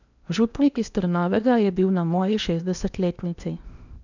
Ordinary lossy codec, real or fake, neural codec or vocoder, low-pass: none; fake; codec, 16 kHz in and 24 kHz out, 0.8 kbps, FocalCodec, streaming, 65536 codes; 7.2 kHz